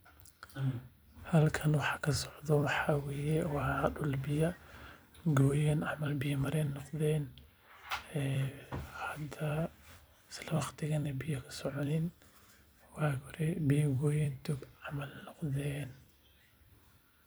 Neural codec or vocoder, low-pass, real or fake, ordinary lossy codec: vocoder, 44.1 kHz, 128 mel bands every 256 samples, BigVGAN v2; none; fake; none